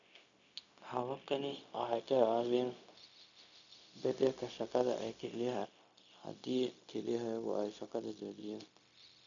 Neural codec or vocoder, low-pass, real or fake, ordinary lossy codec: codec, 16 kHz, 0.4 kbps, LongCat-Audio-Codec; 7.2 kHz; fake; none